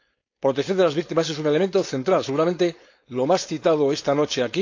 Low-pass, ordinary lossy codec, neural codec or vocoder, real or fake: 7.2 kHz; AAC, 48 kbps; codec, 16 kHz, 4.8 kbps, FACodec; fake